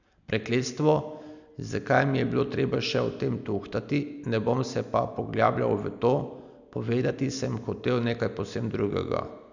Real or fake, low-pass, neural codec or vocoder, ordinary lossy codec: real; 7.2 kHz; none; none